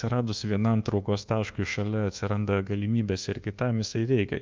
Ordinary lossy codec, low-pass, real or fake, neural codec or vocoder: Opus, 24 kbps; 7.2 kHz; fake; codec, 24 kHz, 1.2 kbps, DualCodec